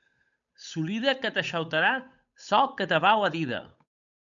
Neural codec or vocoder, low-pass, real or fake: codec, 16 kHz, 8 kbps, FunCodec, trained on Chinese and English, 25 frames a second; 7.2 kHz; fake